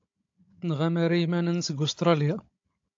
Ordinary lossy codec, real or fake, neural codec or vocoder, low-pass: AAC, 48 kbps; fake; codec, 16 kHz, 16 kbps, FunCodec, trained on Chinese and English, 50 frames a second; 7.2 kHz